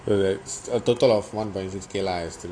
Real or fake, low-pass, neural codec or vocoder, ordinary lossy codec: real; 9.9 kHz; none; AAC, 48 kbps